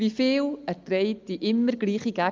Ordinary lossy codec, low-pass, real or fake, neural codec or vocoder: Opus, 32 kbps; 7.2 kHz; real; none